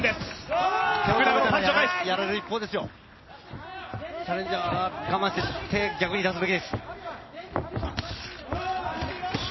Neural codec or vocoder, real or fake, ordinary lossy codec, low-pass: none; real; MP3, 24 kbps; 7.2 kHz